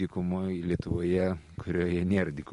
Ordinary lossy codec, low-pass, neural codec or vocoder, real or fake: MP3, 48 kbps; 10.8 kHz; none; real